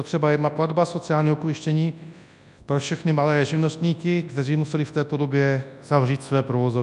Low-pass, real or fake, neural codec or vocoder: 10.8 kHz; fake; codec, 24 kHz, 0.9 kbps, WavTokenizer, large speech release